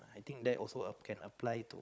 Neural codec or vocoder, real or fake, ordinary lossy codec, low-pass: codec, 16 kHz, 16 kbps, FunCodec, trained on Chinese and English, 50 frames a second; fake; none; none